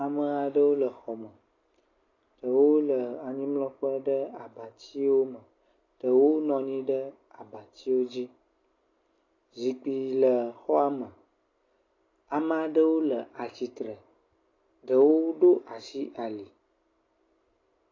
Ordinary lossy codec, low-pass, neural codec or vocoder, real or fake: AAC, 32 kbps; 7.2 kHz; none; real